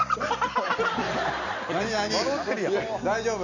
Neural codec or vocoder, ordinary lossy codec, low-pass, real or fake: none; none; 7.2 kHz; real